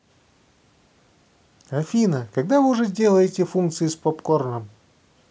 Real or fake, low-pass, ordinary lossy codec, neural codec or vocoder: real; none; none; none